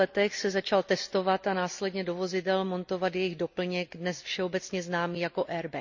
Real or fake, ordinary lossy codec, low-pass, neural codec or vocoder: real; none; 7.2 kHz; none